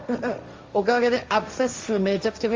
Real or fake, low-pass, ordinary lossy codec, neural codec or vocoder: fake; 7.2 kHz; Opus, 32 kbps; codec, 16 kHz, 1.1 kbps, Voila-Tokenizer